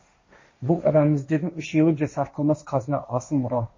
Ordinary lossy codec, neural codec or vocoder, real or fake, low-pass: MP3, 32 kbps; codec, 16 kHz, 1.1 kbps, Voila-Tokenizer; fake; 7.2 kHz